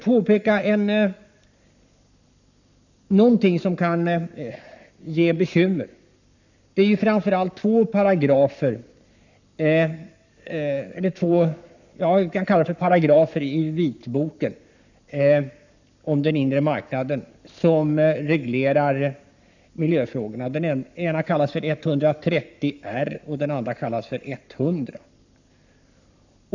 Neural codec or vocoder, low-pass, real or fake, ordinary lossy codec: codec, 44.1 kHz, 7.8 kbps, Pupu-Codec; 7.2 kHz; fake; none